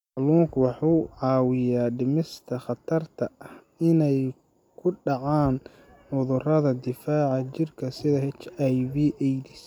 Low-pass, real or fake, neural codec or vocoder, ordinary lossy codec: 19.8 kHz; real; none; none